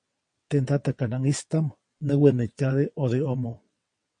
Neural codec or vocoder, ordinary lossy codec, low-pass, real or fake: vocoder, 22.05 kHz, 80 mel bands, WaveNeXt; MP3, 48 kbps; 9.9 kHz; fake